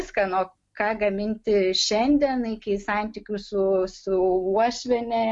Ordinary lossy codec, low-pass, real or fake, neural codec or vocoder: MP3, 64 kbps; 10.8 kHz; real; none